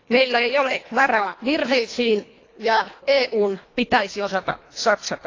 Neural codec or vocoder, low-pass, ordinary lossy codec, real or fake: codec, 24 kHz, 1.5 kbps, HILCodec; 7.2 kHz; AAC, 32 kbps; fake